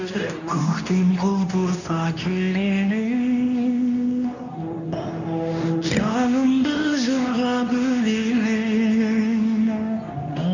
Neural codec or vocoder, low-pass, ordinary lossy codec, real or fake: codec, 24 kHz, 0.9 kbps, WavTokenizer, medium speech release version 2; 7.2 kHz; none; fake